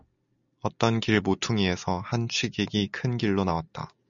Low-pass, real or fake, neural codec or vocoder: 7.2 kHz; real; none